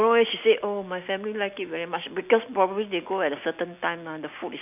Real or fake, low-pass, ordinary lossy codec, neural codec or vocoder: real; 3.6 kHz; none; none